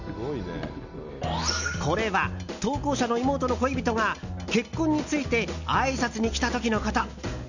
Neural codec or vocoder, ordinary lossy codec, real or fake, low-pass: none; none; real; 7.2 kHz